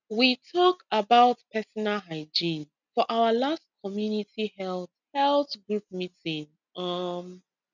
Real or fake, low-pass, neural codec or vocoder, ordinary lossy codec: real; 7.2 kHz; none; none